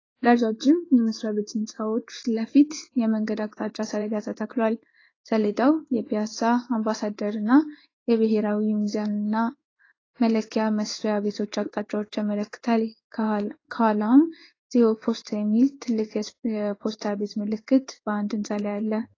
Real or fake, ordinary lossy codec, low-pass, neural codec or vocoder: fake; AAC, 32 kbps; 7.2 kHz; codec, 16 kHz in and 24 kHz out, 1 kbps, XY-Tokenizer